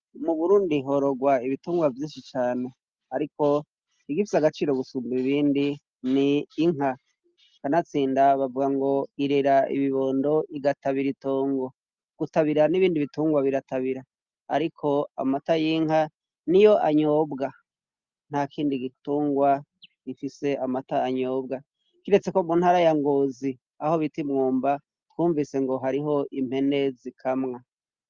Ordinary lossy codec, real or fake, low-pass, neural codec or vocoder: Opus, 24 kbps; real; 7.2 kHz; none